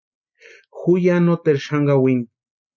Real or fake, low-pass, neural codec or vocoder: real; 7.2 kHz; none